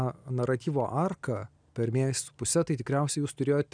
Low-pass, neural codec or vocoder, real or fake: 9.9 kHz; none; real